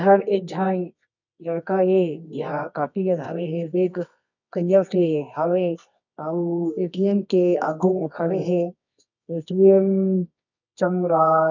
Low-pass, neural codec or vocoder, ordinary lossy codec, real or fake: 7.2 kHz; codec, 24 kHz, 0.9 kbps, WavTokenizer, medium music audio release; none; fake